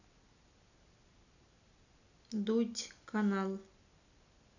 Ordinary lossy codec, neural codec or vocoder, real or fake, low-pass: none; none; real; 7.2 kHz